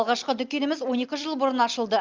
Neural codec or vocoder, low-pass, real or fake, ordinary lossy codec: none; 7.2 kHz; real; Opus, 24 kbps